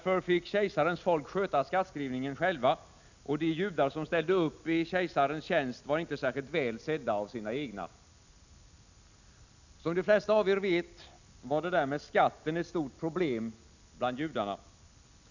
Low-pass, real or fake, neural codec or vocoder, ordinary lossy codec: 7.2 kHz; real; none; none